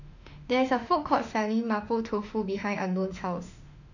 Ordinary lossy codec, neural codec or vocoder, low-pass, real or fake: none; autoencoder, 48 kHz, 32 numbers a frame, DAC-VAE, trained on Japanese speech; 7.2 kHz; fake